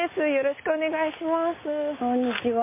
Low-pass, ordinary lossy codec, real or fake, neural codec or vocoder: 3.6 kHz; MP3, 24 kbps; real; none